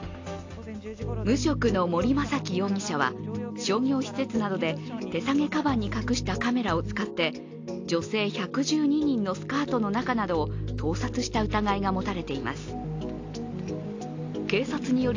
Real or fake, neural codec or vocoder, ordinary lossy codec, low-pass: real; none; AAC, 48 kbps; 7.2 kHz